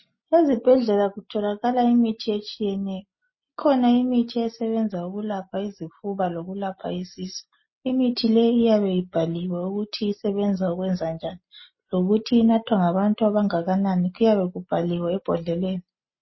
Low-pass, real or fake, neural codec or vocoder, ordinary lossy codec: 7.2 kHz; real; none; MP3, 24 kbps